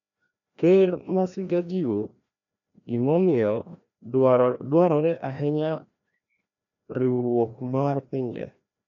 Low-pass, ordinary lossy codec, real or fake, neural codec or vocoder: 7.2 kHz; none; fake; codec, 16 kHz, 1 kbps, FreqCodec, larger model